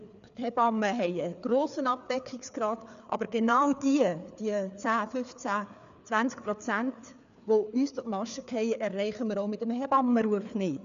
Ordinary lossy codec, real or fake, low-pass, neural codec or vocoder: none; fake; 7.2 kHz; codec, 16 kHz, 4 kbps, FreqCodec, larger model